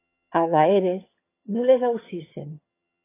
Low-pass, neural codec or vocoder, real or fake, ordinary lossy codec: 3.6 kHz; vocoder, 22.05 kHz, 80 mel bands, HiFi-GAN; fake; AAC, 16 kbps